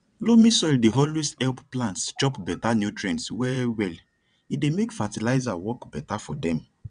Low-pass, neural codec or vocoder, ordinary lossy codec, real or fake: 9.9 kHz; vocoder, 22.05 kHz, 80 mel bands, WaveNeXt; none; fake